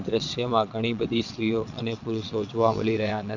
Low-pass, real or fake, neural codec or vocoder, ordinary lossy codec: 7.2 kHz; fake; vocoder, 22.05 kHz, 80 mel bands, WaveNeXt; none